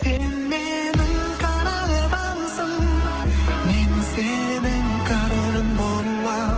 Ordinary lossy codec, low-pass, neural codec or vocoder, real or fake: Opus, 16 kbps; 7.2 kHz; autoencoder, 48 kHz, 128 numbers a frame, DAC-VAE, trained on Japanese speech; fake